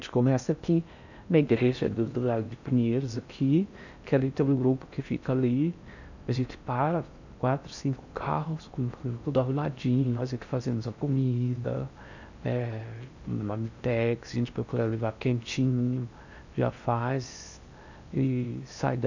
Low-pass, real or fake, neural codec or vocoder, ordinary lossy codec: 7.2 kHz; fake; codec, 16 kHz in and 24 kHz out, 0.6 kbps, FocalCodec, streaming, 4096 codes; none